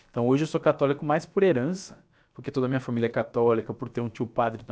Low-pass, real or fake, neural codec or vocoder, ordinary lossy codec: none; fake; codec, 16 kHz, about 1 kbps, DyCAST, with the encoder's durations; none